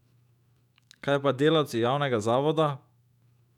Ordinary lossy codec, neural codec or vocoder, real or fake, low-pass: none; autoencoder, 48 kHz, 128 numbers a frame, DAC-VAE, trained on Japanese speech; fake; 19.8 kHz